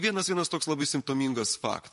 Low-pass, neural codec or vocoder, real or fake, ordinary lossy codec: 14.4 kHz; vocoder, 44.1 kHz, 128 mel bands, Pupu-Vocoder; fake; MP3, 48 kbps